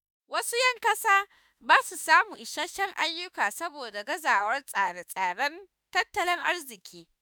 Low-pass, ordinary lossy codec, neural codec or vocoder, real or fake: none; none; autoencoder, 48 kHz, 32 numbers a frame, DAC-VAE, trained on Japanese speech; fake